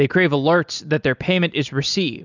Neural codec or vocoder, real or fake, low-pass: none; real; 7.2 kHz